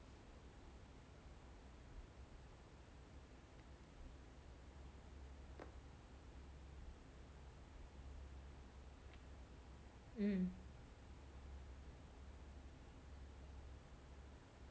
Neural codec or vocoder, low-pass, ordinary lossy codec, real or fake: none; none; none; real